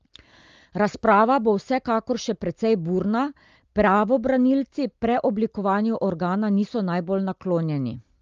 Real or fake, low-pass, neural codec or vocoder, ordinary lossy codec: real; 7.2 kHz; none; Opus, 32 kbps